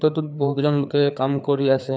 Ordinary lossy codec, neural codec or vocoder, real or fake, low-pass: none; codec, 16 kHz, 4 kbps, FreqCodec, larger model; fake; none